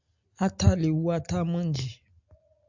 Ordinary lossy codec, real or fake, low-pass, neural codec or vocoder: AAC, 48 kbps; real; 7.2 kHz; none